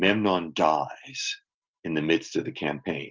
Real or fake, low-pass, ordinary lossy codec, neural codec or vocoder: real; 7.2 kHz; Opus, 24 kbps; none